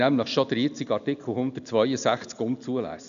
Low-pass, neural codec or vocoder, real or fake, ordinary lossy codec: 7.2 kHz; none; real; none